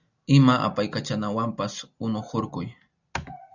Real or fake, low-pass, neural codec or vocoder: real; 7.2 kHz; none